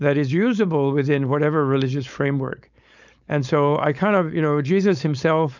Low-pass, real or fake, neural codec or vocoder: 7.2 kHz; fake; codec, 16 kHz, 4.8 kbps, FACodec